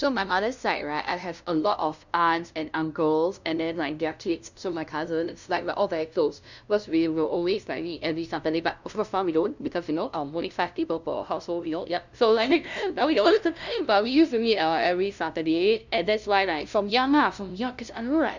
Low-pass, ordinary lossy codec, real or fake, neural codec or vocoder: 7.2 kHz; none; fake; codec, 16 kHz, 0.5 kbps, FunCodec, trained on LibriTTS, 25 frames a second